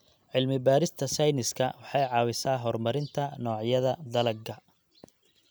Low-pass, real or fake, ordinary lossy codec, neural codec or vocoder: none; real; none; none